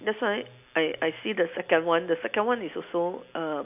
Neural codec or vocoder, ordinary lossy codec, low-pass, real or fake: none; none; 3.6 kHz; real